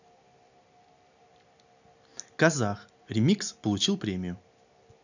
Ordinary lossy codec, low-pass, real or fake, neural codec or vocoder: none; 7.2 kHz; real; none